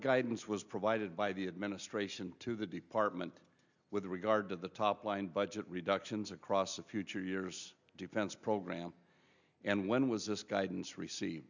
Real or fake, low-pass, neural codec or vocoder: real; 7.2 kHz; none